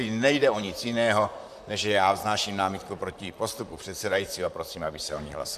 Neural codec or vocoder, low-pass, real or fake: vocoder, 44.1 kHz, 128 mel bands, Pupu-Vocoder; 14.4 kHz; fake